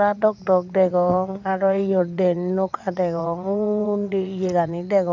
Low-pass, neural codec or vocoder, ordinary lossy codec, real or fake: 7.2 kHz; vocoder, 22.05 kHz, 80 mel bands, WaveNeXt; none; fake